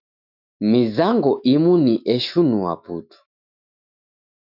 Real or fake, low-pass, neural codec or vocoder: fake; 5.4 kHz; autoencoder, 48 kHz, 128 numbers a frame, DAC-VAE, trained on Japanese speech